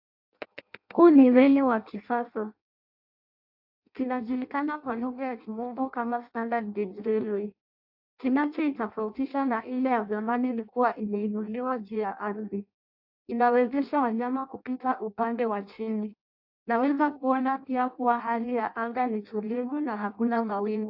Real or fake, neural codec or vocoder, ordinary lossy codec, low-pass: fake; codec, 16 kHz in and 24 kHz out, 0.6 kbps, FireRedTTS-2 codec; AAC, 48 kbps; 5.4 kHz